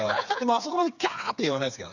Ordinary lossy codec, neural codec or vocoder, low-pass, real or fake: none; codec, 16 kHz, 4 kbps, FreqCodec, smaller model; 7.2 kHz; fake